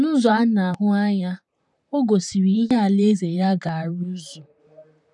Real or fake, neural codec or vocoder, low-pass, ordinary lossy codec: fake; vocoder, 44.1 kHz, 128 mel bands, Pupu-Vocoder; 10.8 kHz; none